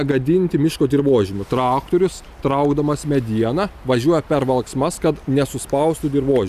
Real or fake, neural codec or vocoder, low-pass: real; none; 14.4 kHz